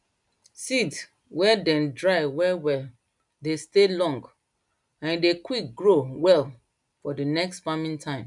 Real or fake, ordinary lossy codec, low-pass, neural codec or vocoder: real; none; 10.8 kHz; none